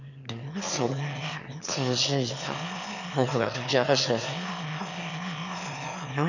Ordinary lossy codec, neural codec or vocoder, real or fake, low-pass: none; autoencoder, 22.05 kHz, a latent of 192 numbers a frame, VITS, trained on one speaker; fake; 7.2 kHz